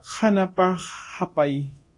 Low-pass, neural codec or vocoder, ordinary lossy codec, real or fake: 10.8 kHz; codec, 24 kHz, 0.9 kbps, DualCodec; AAC, 48 kbps; fake